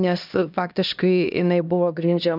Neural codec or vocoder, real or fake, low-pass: codec, 16 kHz, 1 kbps, X-Codec, HuBERT features, trained on LibriSpeech; fake; 5.4 kHz